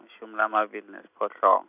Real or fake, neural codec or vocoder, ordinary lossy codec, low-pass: real; none; none; 3.6 kHz